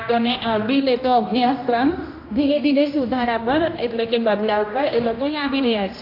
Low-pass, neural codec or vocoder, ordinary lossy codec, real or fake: 5.4 kHz; codec, 16 kHz, 1 kbps, X-Codec, HuBERT features, trained on general audio; AAC, 32 kbps; fake